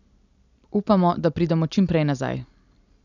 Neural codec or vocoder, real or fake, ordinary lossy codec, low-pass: none; real; none; 7.2 kHz